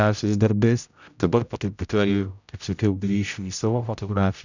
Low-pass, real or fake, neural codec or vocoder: 7.2 kHz; fake; codec, 16 kHz, 0.5 kbps, X-Codec, HuBERT features, trained on general audio